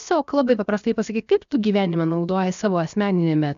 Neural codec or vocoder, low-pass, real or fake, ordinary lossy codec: codec, 16 kHz, 0.7 kbps, FocalCodec; 7.2 kHz; fake; AAC, 96 kbps